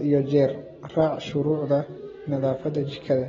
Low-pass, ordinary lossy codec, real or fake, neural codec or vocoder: 7.2 kHz; AAC, 24 kbps; real; none